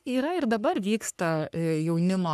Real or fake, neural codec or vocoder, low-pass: fake; codec, 44.1 kHz, 3.4 kbps, Pupu-Codec; 14.4 kHz